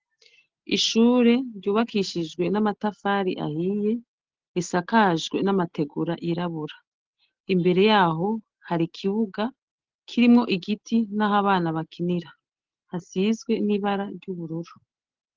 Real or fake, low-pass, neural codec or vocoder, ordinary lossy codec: real; 7.2 kHz; none; Opus, 16 kbps